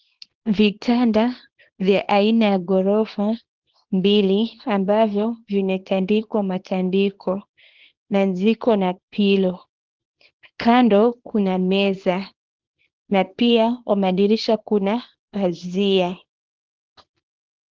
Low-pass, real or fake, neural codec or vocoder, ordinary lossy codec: 7.2 kHz; fake; codec, 24 kHz, 0.9 kbps, WavTokenizer, small release; Opus, 16 kbps